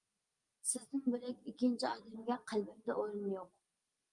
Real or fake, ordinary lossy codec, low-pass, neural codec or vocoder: real; Opus, 32 kbps; 10.8 kHz; none